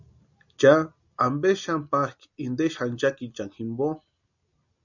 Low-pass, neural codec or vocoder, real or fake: 7.2 kHz; none; real